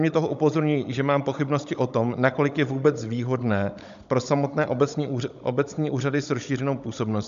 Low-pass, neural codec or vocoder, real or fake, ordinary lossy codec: 7.2 kHz; codec, 16 kHz, 16 kbps, FunCodec, trained on Chinese and English, 50 frames a second; fake; MP3, 64 kbps